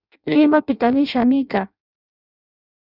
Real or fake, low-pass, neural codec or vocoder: fake; 5.4 kHz; codec, 16 kHz in and 24 kHz out, 0.6 kbps, FireRedTTS-2 codec